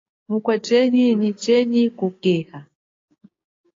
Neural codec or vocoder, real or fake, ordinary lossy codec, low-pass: codec, 16 kHz, 6 kbps, DAC; fake; AAC, 32 kbps; 7.2 kHz